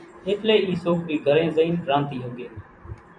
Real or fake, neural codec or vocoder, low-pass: fake; vocoder, 44.1 kHz, 128 mel bands every 256 samples, BigVGAN v2; 9.9 kHz